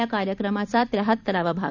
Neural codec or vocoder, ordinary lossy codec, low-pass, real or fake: vocoder, 44.1 kHz, 128 mel bands every 256 samples, BigVGAN v2; none; 7.2 kHz; fake